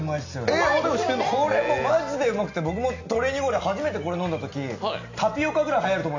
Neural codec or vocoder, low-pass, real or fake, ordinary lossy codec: none; 7.2 kHz; real; AAC, 48 kbps